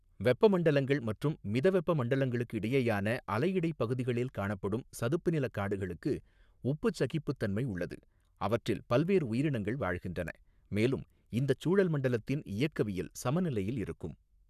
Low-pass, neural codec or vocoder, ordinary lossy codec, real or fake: 14.4 kHz; codec, 44.1 kHz, 7.8 kbps, Pupu-Codec; none; fake